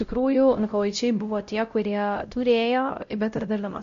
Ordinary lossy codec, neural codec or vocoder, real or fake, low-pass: MP3, 48 kbps; codec, 16 kHz, 0.5 kbps, X-Codec, WavLM features, trained on Multilingual LibriSpeech; fake; 7.2 kHz